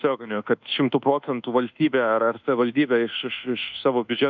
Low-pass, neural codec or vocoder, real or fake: 7.2 kHz; codec, 24 kHz, 1.2 kbps, DualCodec; fake